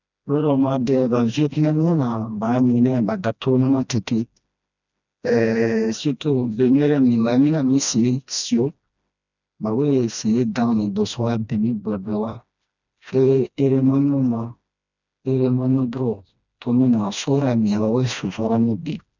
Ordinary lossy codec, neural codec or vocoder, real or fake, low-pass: none; codec, 16 kHz, 1 kbps, FreqCodec, smaller model; fake; 7.2 kHz